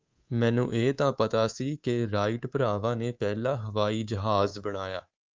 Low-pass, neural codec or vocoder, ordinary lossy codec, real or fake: 7.2 kHz; codec, 24 kHz, 3.1 kbps, DualCodec; Opus, 24 kbps; fake